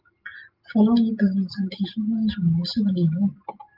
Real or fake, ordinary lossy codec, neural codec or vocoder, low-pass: real; Opus, 32 kbps; none; 5.4 kHz